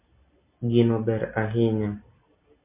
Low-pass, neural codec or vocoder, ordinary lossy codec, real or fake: 3.6 kHz; none; MP3, 24 kbps; real